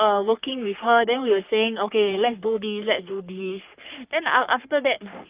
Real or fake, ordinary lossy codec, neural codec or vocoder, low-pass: fake; Opus, 24 kbps; codec, 44.1 kHz, 3.4 kbps, Pupu-Codec; 3.6 kHz